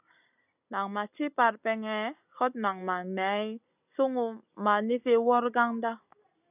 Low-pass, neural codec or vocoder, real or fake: 3.6 kHz; none; real